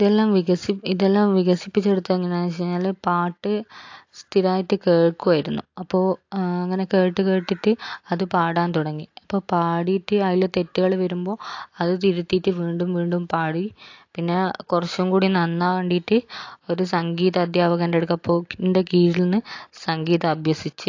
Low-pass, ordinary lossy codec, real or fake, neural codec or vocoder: 7.2 kHz; AAC, 48 kbps; real; none